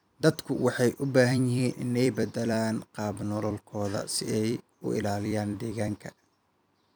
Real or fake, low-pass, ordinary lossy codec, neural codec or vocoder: fake; none; none; vocoder, 44.1 kHz, 128 mel bands every 256 samples, BigVGAN v2